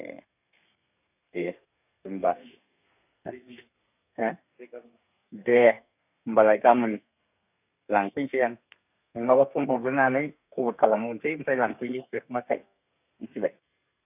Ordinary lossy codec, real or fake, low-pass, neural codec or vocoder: none; fake; 3.6 kHz; codec, 32 kHz, 1.9 kbps, SNAC